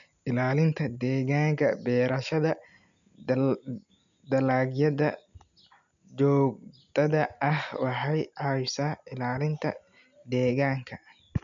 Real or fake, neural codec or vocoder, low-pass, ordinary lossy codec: real; none; 7.2 kHz; none